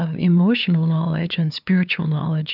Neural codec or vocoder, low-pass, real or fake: codec, 16 kHz, 2 kbps, FunCodec, trained on LibriTTS, 25 frames a second; 5.4 kHz; fake